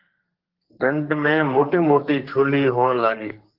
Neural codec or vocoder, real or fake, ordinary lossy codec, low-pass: codec, 32 kHz, 1.9 kbps, SNAC; fake; Opus, 16 kbps; 5.4 kHz